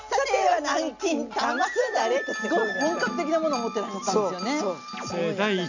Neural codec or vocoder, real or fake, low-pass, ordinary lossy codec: none; real; 7.2 kHz; none